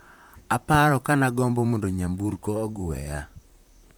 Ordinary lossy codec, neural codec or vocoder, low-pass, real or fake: none; vocoder, 44.1 kHz, 128 mel bands, Pupu-Vocoder; none; fake